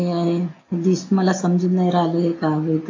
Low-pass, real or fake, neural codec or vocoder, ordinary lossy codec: 7.2 kHz; real; none; AAC, 32 kbps